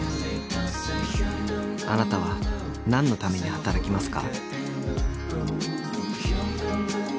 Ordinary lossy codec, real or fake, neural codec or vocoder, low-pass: none; real; none; none